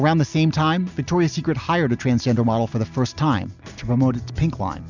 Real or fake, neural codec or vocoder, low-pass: real; none; 7.2 kHz